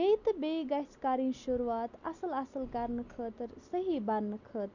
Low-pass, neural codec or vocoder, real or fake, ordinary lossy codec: 7.2 kHz; none; real; none